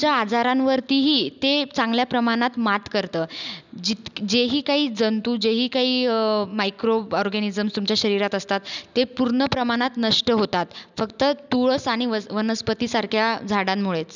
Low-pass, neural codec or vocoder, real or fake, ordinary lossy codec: 7.2 kHz; none; real; none